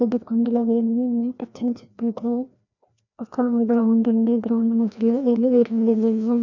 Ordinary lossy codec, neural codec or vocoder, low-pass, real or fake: none; codec, 16 kHz, 1 kbps, FreqCodec, larger model; 7.2 kHz; fake